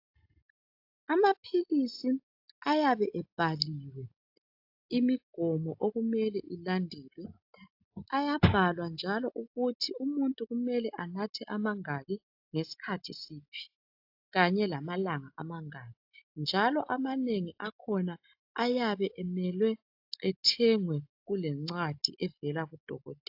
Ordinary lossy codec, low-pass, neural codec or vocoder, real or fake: AAC, 48 kbps; 5.4 kHz; none; real